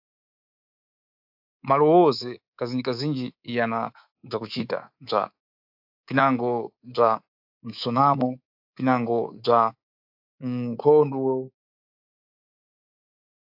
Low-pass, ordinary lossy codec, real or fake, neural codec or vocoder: 5.4 kHz; AAC, 48 kbps; fake; codec, 24 kHz, 3.1 kbps, DualCodec